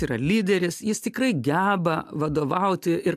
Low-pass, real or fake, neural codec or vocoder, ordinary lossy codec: 14.4 kHz; real; none; AAC, 96 kbps